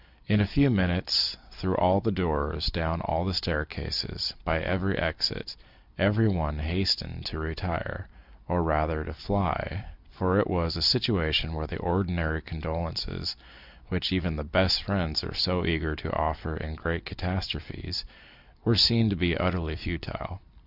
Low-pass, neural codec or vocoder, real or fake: 5.4 kHz; none; real